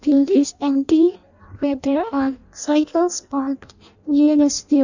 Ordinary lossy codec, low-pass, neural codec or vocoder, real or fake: none; 7.2 kHz; codec, 16 kHz in and 24 kHz out, 0.6 kbps, FireRedTTS-2 codec; fake